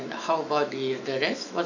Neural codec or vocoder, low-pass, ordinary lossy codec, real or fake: autoencoder, 48 kHz, 128 numbers a frame, DAC-VAE, trained on Japanese speech; 7.2 kHz; none; fake